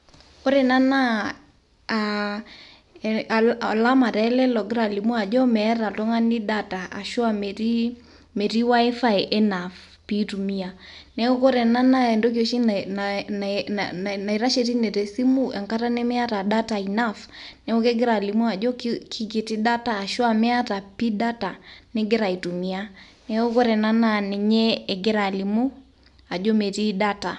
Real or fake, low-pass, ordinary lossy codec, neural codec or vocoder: real; 10.8 kHz; none; none